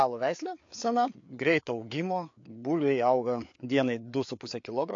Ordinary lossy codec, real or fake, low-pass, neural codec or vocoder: AAC, 48 kbps; fake; 7.2 kHz; codec, 16 kHz, 4 kbps, FreqCodec, larger model